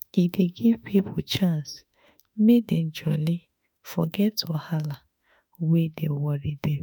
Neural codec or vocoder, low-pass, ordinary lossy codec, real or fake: autoencoder, 48 kHz, 32 numbers a frame, DAC-VAE, trained on Japanese speech; none; none; fake